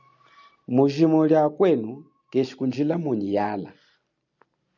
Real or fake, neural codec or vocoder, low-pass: real; none; 7.2 kHz